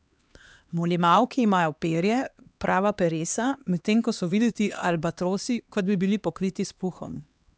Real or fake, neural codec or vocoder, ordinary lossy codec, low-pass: fake; codec, 16 kHz, 2 kbps, X-Codec, HuBERT features, trained on LibriSpeech; none; none